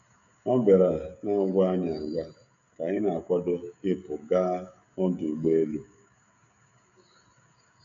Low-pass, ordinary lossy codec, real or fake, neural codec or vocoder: 7.2 kHz; none; fake; codec, 16 kHz, 16 kbps, FreqCodec, smaller model